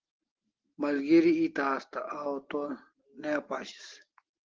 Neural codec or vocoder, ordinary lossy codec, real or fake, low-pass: none; Opus, 16 kbps; real; 7.2 kHz